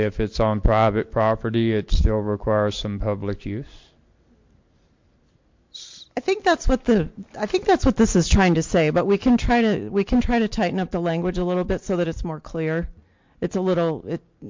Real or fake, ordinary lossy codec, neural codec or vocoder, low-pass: real; MP3, 48 kbps; none; 7.2 kHz